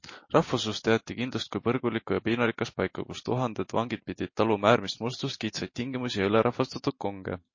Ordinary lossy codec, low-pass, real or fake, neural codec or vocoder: MP3, 32 kbps; 7.2 kHz; real; none